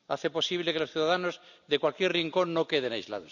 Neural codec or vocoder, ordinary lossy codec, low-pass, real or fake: none; none; 7.2 kHz; real